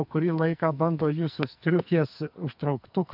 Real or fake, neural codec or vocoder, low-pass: fake; codec, 44.1 kHz, 2.6 kbps, SNAC; 5.4 kHz